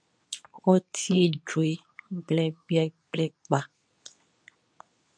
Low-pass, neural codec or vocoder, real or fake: 9.9 kHz; codec, 24 kHz, 0.9 kbps, WavTokenizer, medium speech release version 2; fake